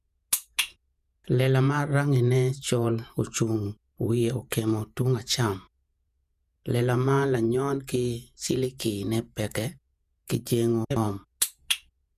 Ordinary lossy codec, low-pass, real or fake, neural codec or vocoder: none; 14.4 kHz; fake; vocoder, 48 kHz, 128 mel bands, Vocos